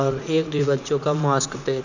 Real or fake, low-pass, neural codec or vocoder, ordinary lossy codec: fake; 7.2 kHz; vocoder, 44.1 kHz, 80 mel bands, Vocos; none